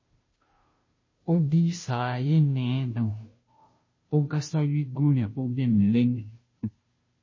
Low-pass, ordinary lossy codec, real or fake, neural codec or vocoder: 7.2 kHz; MP3, 32 kbps; fake; codec, 16 kHz, 0.5 kbps, FunCodec, trained on Chinese and English, 25 frames a second